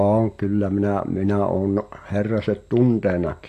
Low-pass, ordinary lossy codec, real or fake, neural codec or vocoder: 14.4 kHz; AAC, 64 kbps; real; none